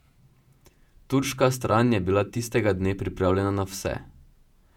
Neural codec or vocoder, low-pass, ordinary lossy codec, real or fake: vocoder, 48 kHz, 128 mel bands, Vocos; 19.8 kHz; none; fake